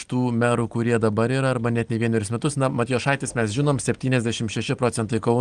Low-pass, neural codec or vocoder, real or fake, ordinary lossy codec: 9.9 kHz; none; real; Opus, 24 kbps